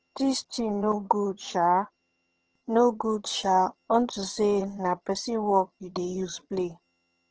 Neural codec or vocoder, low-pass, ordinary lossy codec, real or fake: vocoder, 22.05 kHz, 80 mel bands, HiFi-GAN; 7.2 kHz; Opus, 16 kbps; fake